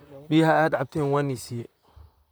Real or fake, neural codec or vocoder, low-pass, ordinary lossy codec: fake; vocoder, 44.1 kHz, 128 mel bands, Pupu-Vocoder; none; none